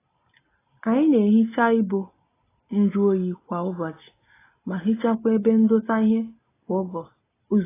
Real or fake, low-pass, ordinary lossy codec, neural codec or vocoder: real; 3.6 kHz; AAC, 16 kbps; none